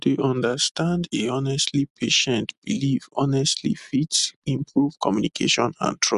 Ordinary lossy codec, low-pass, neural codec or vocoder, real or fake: MP3, 96 kbps; 10.8 kHz; none; real